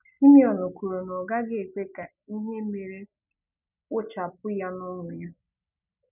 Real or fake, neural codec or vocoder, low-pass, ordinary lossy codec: real; none; 3.6 kHz; none